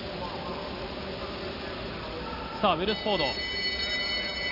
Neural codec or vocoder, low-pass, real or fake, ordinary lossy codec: none; 5.4 kHz; real; Opus, 64 kbps